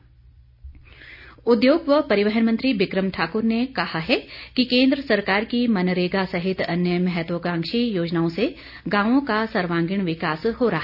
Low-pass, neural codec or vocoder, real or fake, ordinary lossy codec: 5.4 kHz; none; real; none